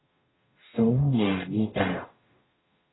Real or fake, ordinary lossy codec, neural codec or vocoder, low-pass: fake; AAC, 16 kbps; codec, 44.1 kHz, 0.9 kbps, DAC; 7.2 kHz